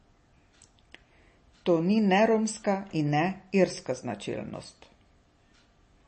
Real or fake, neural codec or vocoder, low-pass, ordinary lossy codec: real; none; 10.8 kHz; MP3, 32 kbps